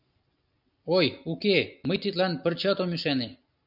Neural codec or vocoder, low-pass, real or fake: none; 5.4 kHz; real